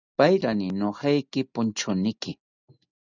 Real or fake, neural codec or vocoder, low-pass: real; none; 7.2 kHz